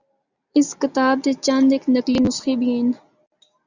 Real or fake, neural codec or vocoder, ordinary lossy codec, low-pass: real; none; Opus, 64 kbps; 7.2 kHz